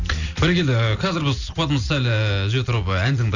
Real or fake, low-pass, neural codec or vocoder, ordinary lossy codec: real; 7.2 kHz; none; none